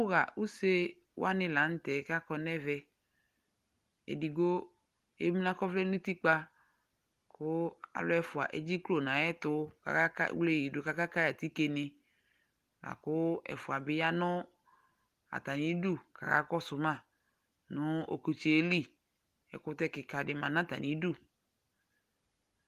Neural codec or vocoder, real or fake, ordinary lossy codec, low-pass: none; real; Opus, 24 kbps; 14.4 kHz